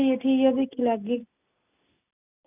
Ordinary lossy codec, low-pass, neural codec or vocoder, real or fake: none; 3.6 kHz; none; real